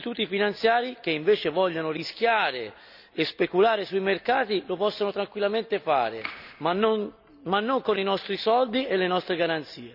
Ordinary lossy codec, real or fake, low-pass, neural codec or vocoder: none; real; 5.4 kHz; none